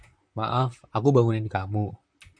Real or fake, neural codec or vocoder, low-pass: fake; vocoder, 44.1 kHz, 128 mel bands, Pupu-Vocoder; 9.9 kHz